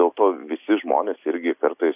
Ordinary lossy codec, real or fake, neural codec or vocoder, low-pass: AAC, 32 kbps; real; none; 3.6 kHz